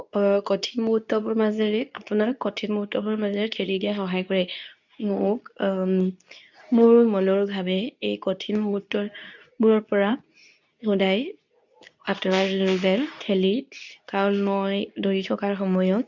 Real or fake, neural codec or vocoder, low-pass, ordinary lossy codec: fake; codec, 24 kHz, 0.9 kbps, WavTokenizer, medium speech release version 2; 7.2 kHz; none